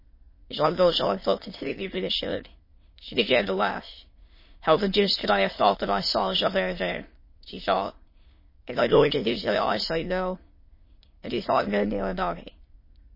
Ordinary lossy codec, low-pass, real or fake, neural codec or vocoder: MP3, 24 kbps; 5.4 kHz; fake; autoencoder, 22.05 kHz, a latent of 192 numbers a frame, VITS, trained on many speakers